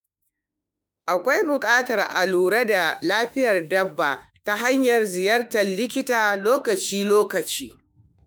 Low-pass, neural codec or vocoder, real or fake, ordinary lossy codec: none; autoencoder, 48 kHz, 32 numbers a frame, DAC-VAE, trained on Japanese speech; fake; none